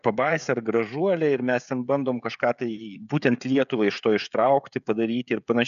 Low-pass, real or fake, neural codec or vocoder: 7.2 kHz; fake; codec, 16 kHz, 16 kbps, FreqCodec, smaller model